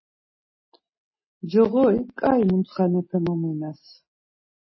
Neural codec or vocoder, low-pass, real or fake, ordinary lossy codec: none; 7.2 kHz; real; MP3, 24 kbps